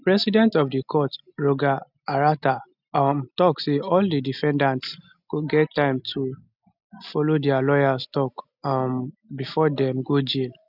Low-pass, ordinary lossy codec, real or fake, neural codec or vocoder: 5.4 kHz; none; real; none